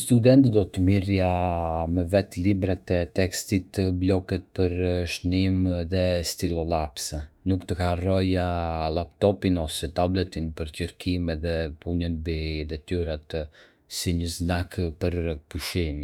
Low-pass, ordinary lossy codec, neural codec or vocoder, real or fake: 19.8 kHz; none; autoencoder, 48 kHz, 32 numbers a frame, DAC-VAE, trained on Japanese speech; fake